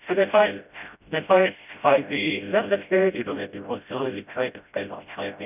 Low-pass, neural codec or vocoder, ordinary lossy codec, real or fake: 3.6 kHz; codec, 16 kHz, 0.5 kbps, FreqCodec, smaller model; none; fake